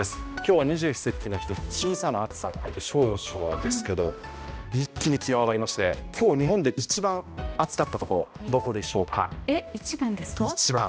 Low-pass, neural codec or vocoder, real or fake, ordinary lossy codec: none; codec, 16 kHz, 1 kbps, X-Codec, HuBERT features, trained on balanced general audio; fake; none